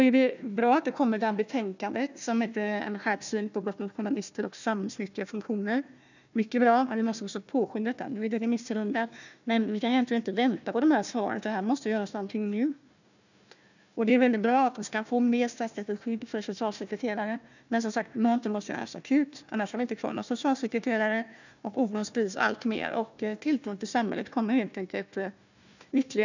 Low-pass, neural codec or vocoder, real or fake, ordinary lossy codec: 7.2 kHz; codec, 16 kHz, 1 kbps, FunCodec, trained on Chinese and English, 50 frames a second; fake; none